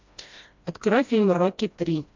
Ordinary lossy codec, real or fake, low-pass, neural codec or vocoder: MP3, 64 kbps; fake; 7.2 kHz; codec, 16 kHz, 1 kbps, FreqCodec, smaller model